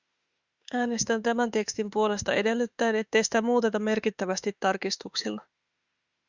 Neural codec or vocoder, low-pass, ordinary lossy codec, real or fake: autoencoder, 48 kHz, 32 numbers a frame, DAC-VAE, trained on Japanese speech; 7.2 kHz; Opus, 64 kbps; fake